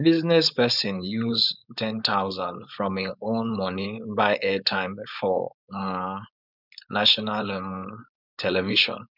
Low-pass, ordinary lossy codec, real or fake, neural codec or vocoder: 5.4 kHz; none; fake; codec, 16 kHz, 4.8 kbps, FACodec